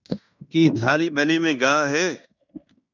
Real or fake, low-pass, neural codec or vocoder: fake; 7.2 kHz; codec, 16 kHz in and 24 kHz out, 0.9 kbps, LongCat-Audio-Codec, fine tuned four codebook decoder